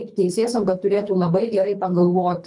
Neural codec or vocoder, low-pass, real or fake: codec, 24 kHz, 3 kbps, HILCodec; 10.8 kHz; fake